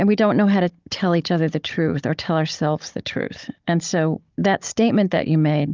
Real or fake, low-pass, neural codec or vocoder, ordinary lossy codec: fake; 7.2 kHz; codec, 16 kHz, 16 kbps, FunCodec, trained on Chinese and English, 50 frames a second; Opus, 32 kbps